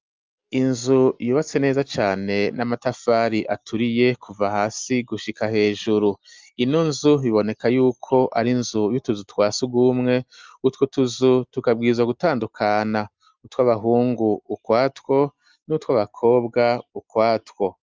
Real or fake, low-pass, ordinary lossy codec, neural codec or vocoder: real; 7.2 kHz; Opus, 24 kbps; none